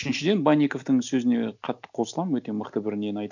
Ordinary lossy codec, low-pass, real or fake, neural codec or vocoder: none; 7.2 kHz; real; none